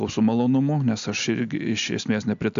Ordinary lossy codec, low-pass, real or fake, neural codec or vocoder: AAC, 96 kbps; 7.2 kHz; real; none